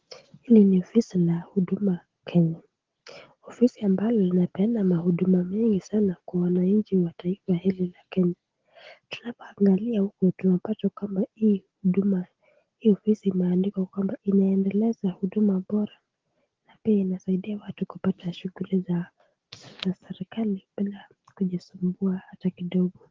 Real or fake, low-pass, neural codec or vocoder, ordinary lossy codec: real; 7.2 kHz; none; Opus, 16 kbps